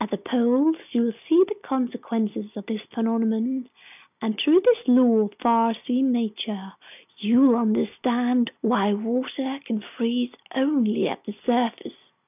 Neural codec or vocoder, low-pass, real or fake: none; 3.6 kHz; real